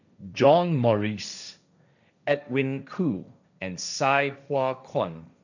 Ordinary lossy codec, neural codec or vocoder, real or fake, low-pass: none; codec, 16 kHz, 1.1 kbps, Voila-Tokenizer; fake; 7.2 kHz